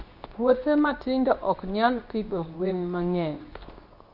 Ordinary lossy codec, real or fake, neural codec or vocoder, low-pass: none; fake; codec, 24 kHz, 0.9 kbps, WavTokenizer, medium speech release version 2; 5.4 kHz